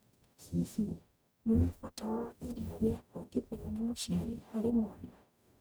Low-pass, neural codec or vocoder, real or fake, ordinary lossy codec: none; codec, 44.1 kHz, 0.9 kbps, DAC; fake; none